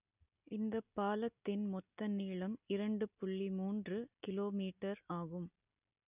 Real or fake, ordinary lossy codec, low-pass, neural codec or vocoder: real; none; 3.6 kHz; none